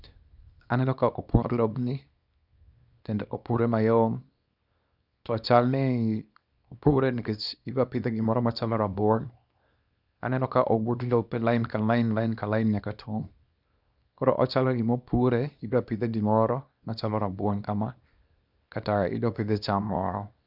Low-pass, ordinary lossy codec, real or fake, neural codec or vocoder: 5.4 kHz; none; fake; codec, 24 kHz, 0.9 kbps, WavTokenizer, small release